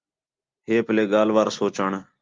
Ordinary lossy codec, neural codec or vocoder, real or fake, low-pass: Opus, 32 kbps; none; real; 7.2 kHz